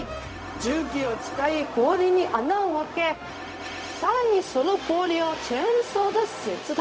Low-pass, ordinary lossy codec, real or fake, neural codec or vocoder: none; none; fake; codec, 16 kHz, 0.4 kbps, LongCat-Audio-Codec